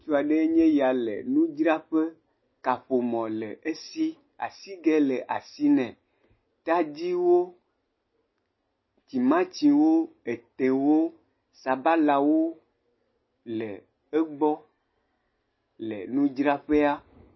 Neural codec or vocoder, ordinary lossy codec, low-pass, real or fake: none; MP3, 24 kbps; 7.2 kHz; real